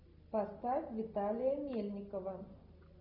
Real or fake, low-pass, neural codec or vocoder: real; 5.4 kHz; none